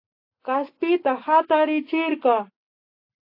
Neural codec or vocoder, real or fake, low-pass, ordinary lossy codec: none; real; 5.4 kHz; AAC, 32 kbps